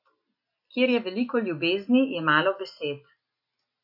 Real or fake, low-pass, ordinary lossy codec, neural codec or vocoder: real; 5.4 kHz; AAC, 48 kbps; none